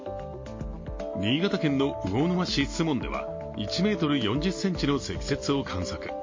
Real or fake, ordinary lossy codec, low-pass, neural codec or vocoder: real; MP3, 32 kbps; 7.2 kHz; none